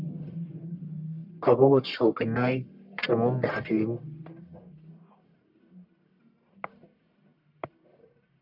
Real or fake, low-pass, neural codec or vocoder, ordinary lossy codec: fake; 5.4 kHz; codec, 44.1 kHz, 1.7 kbps, Pupu-Codec; MP3, 48 kbps